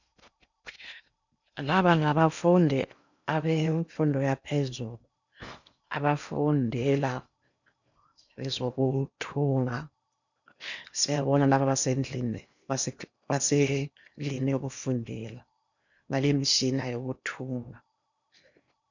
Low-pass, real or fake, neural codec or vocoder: 7.2 kHz; fake; codec, 16 kHz in and 24 kHz out, 0.8 kbps, FocalCodec, streaming, 65536 codes